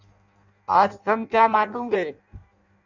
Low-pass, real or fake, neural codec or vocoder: 7.2 kHz; fake; codec, 16 kHz in and 24 kHz out, 0.6 kbps, FireRedTTS-2 codec